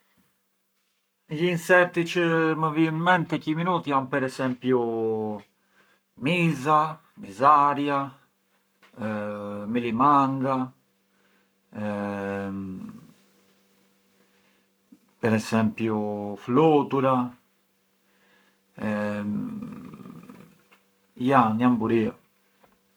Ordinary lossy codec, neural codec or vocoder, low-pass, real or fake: none; codec, 44.1 kHz, 7.8 kbps, Pupu-Codec; none; fake